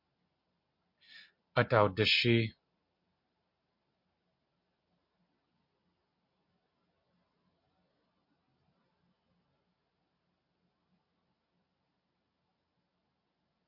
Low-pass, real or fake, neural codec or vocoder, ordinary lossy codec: 5.4 kHz; real; none; MP3, 48 kbps